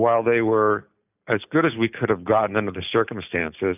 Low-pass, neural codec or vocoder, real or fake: 3.6 kHz; none; real